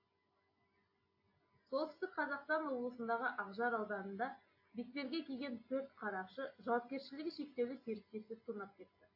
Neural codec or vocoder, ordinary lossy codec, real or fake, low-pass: none; AAC, 32 kbps; real; 5.4 kHz